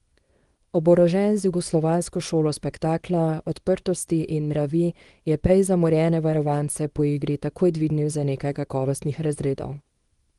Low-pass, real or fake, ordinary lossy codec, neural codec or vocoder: 10.8 kHz; fake; Opus, 24 kbps; codec, 24 kHz, 0.9 kbps, WavTokenizer, small release